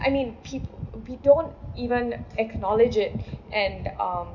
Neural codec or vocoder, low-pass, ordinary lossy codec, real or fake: none; 7.2 kHz; none; real